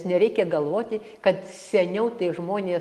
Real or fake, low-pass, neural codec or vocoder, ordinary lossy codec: real; 14.4 kHz; none; Opus, 24 kbps